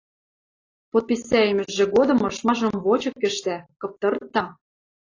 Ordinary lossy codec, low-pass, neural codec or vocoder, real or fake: AAC, 32 kbps; 7.2 kHz; none; real